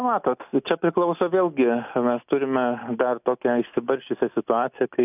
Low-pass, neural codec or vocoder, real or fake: 3.6 kHz; none; real